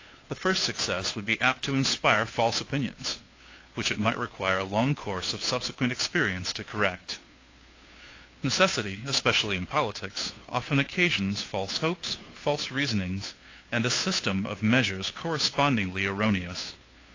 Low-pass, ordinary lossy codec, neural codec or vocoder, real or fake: 7.2 kHz; AAC, 32 kbps; codec, 16 kHz, 2 kbps, FunCodec, trained on Chinese and English, 25 frames a second; fake